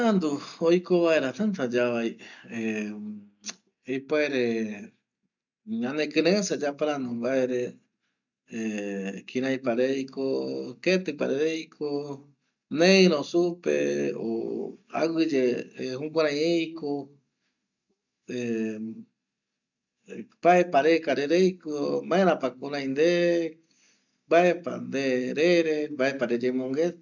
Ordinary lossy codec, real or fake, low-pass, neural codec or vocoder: none; real; 7.2 kHz; none